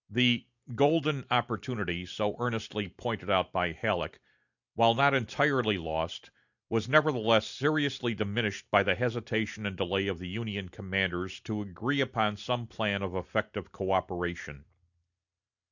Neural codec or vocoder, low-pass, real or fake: none; 7.2 kHz; real